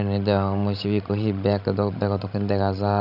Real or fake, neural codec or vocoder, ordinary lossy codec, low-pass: fake; codec, 16 kHz, 16 kbps, FreqCodec, larger model; none; 5.4 kHz